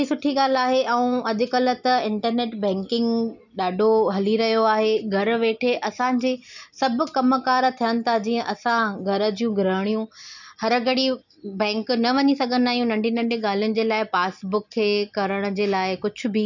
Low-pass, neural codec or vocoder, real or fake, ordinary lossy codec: 7.2 kHz; none; real; none